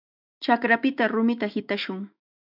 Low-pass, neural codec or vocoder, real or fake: 5.4 kHz; autoencoder, 48 kHz, 128 numbers a frame, DAC-VAE, trained on Japanese speech; fake